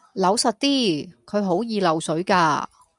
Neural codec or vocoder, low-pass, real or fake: none; 10.8 kHz; real